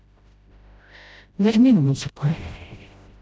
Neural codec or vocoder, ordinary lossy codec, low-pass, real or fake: codec, 16 kHz, 0.5 kbps, FreqCodec, smaller model; none; none; fake